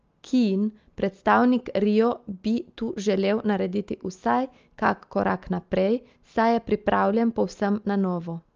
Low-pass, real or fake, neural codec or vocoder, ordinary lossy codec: 7.2 kHz; real; none; Opus, 24 kbps